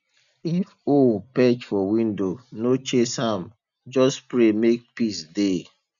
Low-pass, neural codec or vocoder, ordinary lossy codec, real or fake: 7.2 kHz; none; none; real